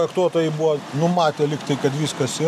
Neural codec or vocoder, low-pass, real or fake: none; 14.4 kHz; real